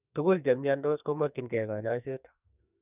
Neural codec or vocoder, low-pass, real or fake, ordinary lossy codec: codec, 44.1 kHz, 2.6 kbps, SNAC; 3.6 kHz; fake; none